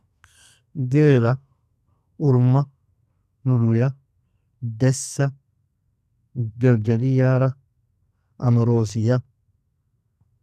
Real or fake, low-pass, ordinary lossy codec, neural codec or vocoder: fake; 14.4 kHz; none; codec, 44.1 kHz, 2.6 kbps, SNAC